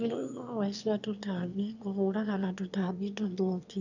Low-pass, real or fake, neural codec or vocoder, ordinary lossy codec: 7.2 kHz; fake; autoencoder, 22.05 kHz, a latent of 192 numbers a frame, VITS, trained on one speaker; none